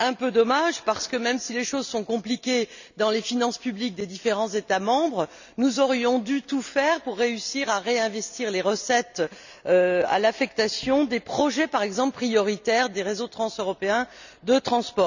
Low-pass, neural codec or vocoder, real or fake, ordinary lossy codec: 7.2 kHz; none; real; none